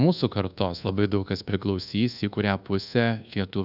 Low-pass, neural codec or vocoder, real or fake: 5.4 kHz; codec, 24 kHz, 1.2 kbps, DualCodec; fake